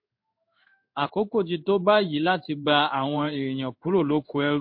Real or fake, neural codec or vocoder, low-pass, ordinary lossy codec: fake; codec, 16 kHz in and 24 kHz out, 1 kbps, XY-Tokenizer; 5.4 kHz; MP3, 32 kbps